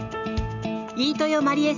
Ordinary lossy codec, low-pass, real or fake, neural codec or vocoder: none; 7.2 kHz; real; none